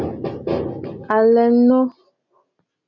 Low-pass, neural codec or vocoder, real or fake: 7.2 kHz; none; real